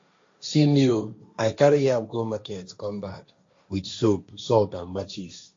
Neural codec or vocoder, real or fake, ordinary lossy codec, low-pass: codec, 16 kHz, 1.1 kbps, Voila-Tokenizer; fake; AAC, 48 kbps; 7.2 kHz